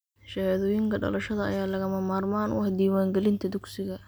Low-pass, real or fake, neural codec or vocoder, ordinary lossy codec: none; real; none; none